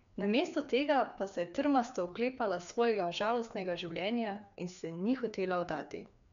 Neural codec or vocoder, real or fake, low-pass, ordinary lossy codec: codec, 16 kHz, 2 kbps, FreqCodec, larger model; fake; 7.2 kHz; none